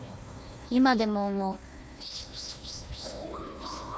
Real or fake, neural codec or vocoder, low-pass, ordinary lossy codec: fake; codec, 16 kHz, 1 kbps, FunCodec, trained on Chinese and English, 50 frames a second; none; none